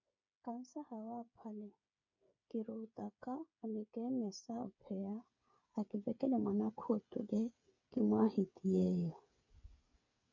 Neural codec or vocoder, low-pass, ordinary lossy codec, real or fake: codec, 16 kHz, 8 kbps, FreqCodec, smaller model; 7.2 kHz; MP3, 48 kbps; fake